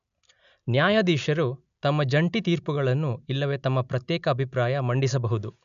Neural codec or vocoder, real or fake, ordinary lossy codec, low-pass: none; real; MP3, 96 kbps; 7.2 kHz